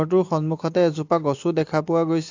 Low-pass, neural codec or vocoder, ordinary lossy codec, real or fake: 7.2 kHz; none; AAC, 48 kbps; real